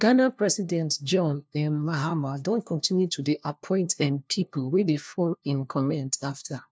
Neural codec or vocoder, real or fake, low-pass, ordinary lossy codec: codec, 16 kHz, 1 kbps, FunCodec, trained on LibriTTS, 50 frames a second; fake; none; none